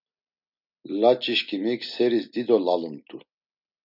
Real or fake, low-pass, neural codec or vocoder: real; 5.4 kHz; none